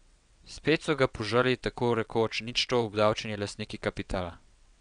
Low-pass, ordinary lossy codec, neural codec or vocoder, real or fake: 9.9 kHz; none; vocoder, 22.05 kHz, 80 mel bands, WaveNeXt; fake